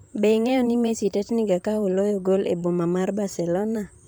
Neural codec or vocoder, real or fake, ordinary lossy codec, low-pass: vocoder, 44.1 kHz, 128 mel bands, Pupu-Vocoder; fake; none; none